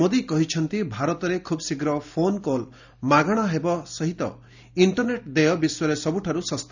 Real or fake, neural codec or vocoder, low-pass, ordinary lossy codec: real; none; 7.2 kHz; none